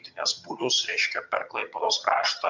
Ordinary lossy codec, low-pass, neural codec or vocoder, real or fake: MP3, 64 kbps; 7.2 kHz; vocoder, 22.05 kHz, 80 mel bands, HiFi-GAN; fake